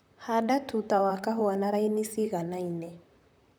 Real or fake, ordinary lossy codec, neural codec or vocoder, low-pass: fake; none; vocoder, 44.1 kHz, 128 mel bands, Pupu-Vocoder; none